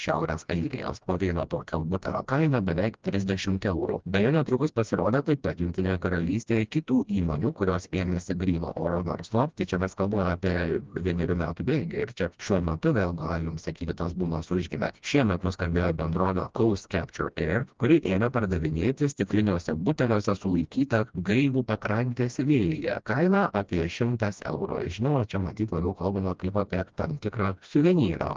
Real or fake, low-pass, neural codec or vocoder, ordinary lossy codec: fake; 7.2 kHz; codec, 16 kHz, 1 kbps, FreqCodec, smaller model; Opus, 24 kbps